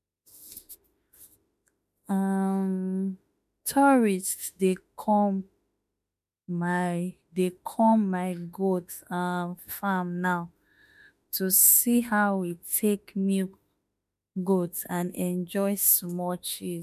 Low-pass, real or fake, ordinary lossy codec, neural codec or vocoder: 14.4 kHz; fake; MP3, 96 kbps; autoencoder, 48 kHz, 32 numbers a frame, DAC-VAE, trained on Japanese speech